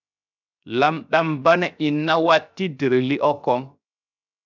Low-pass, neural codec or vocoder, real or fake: 7.2 kHz; codec, 16 kHz, 0.7 kbps, FocalCodec; fake